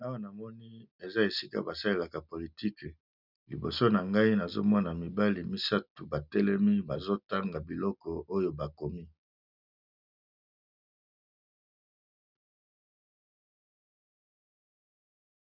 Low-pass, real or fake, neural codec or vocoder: 5.4 kHz; real; none